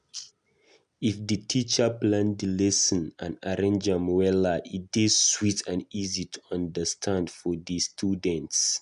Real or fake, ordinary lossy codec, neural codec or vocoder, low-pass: real; none; none; 10.8 kHz